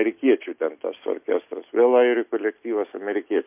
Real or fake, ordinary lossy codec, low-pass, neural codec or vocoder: real; MP3, 32 kbps; 3.6 kHz; none